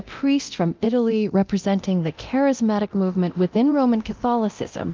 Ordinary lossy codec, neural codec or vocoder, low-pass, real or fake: Opus, 24 kbps; codec, 24 kHz, 0.9 kbps, DualCodec; 7.2 kHz; fake